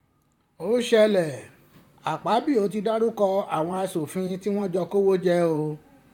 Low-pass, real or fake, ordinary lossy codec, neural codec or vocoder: 19.8 kHz; fake; none; vocoder, 44.1 kHz, 128 mel bands, Pupu-Vocoder